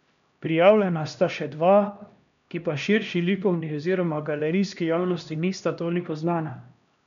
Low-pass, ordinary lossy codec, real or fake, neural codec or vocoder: 7.2 kHz; none; fake; codec, 16 kHz, 1 kbps, X-Codec, HuBERT features, trained on LibriSpeech